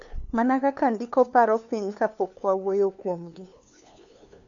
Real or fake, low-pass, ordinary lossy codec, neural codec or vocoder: fake; 7.2 kHz; none; codec, 16 kHz, 2 kbps, FunCodec, trained on LibriTTS, 25 frames a second